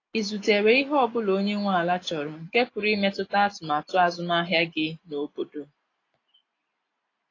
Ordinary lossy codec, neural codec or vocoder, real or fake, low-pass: AAC, 32 kbps; none; real; 7.2 kHz